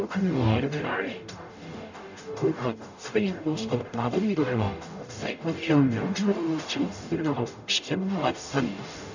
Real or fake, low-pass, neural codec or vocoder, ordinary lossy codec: fake; 7.2 kHz; codec, 44.1 kHz, 0.9 kbps, DAC; none